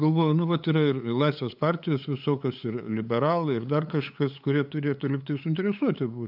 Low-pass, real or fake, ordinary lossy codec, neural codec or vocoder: 5.4 kHz; fake; AAC, 48 kbps; codec, 16 kHz, 8 kbps, FunCodec, trained on LibriTTS, 25 frames a second